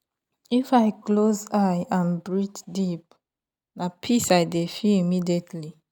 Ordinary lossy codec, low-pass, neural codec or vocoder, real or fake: none; 19.8 kHz; none; real